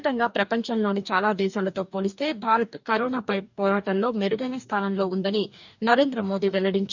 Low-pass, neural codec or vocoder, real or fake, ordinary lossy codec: 7.2 kHz; codec, 44.1 kHz, 2.6 kbps, DAC; fake; none